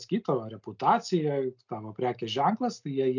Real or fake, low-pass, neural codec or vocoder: real; 7.2 kHz; none